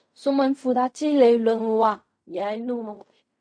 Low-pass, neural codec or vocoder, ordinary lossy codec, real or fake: 9.9 kHz; codec, 16 kHz in and 24 kHz out, 0.4 kbps, LongCat-Audio-Codec, fine tuned four codebook decoder; MP3, 48 kbps; fake